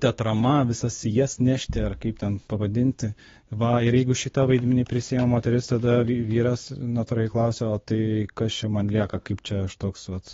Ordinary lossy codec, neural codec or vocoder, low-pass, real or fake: AAC, 24 kbps; codec, 16 kHz, 6 kbps, DAC; 7.2 kHz; fake